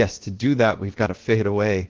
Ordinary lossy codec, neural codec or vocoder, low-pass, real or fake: Opus, 16 kbps; codec, 16 kHz, about 1 kbps, DyCAST, with the encoder's durations; 7.2 kHz; fake